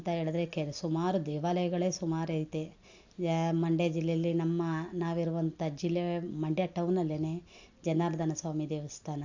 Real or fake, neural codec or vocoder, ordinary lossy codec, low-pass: real; none; AAC, 48 kbps; 7.2 kHz